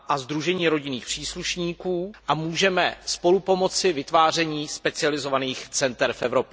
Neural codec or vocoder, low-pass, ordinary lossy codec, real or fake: none; none; none; real